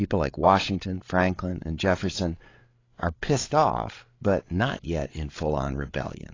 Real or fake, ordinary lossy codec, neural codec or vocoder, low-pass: fake; AAC, 32 kbps; codec, 16 kHz, 8 kbps, FreqCodec, larger model; 7.2 kHz